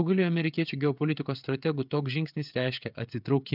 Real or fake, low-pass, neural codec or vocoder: fake; 5.4 kHz; codec, 16 kHz, 16 kbps, FreqCodec, smaller model